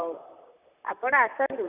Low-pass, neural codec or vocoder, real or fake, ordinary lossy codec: 3.6 kHz; vocoder, 44.1 kHz, 128 mel bands, Pupu-Vocoder; fake; none